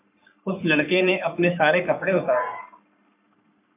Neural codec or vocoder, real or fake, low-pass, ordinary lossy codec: codec, 44.1 kHz, 3.4 kbps, Pupu-Codec; fake; 3.6 kHz; MP3, 32 kbps